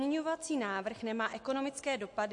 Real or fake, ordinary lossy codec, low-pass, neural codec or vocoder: real; MP3, 48 kbps; 10.8 kHz; none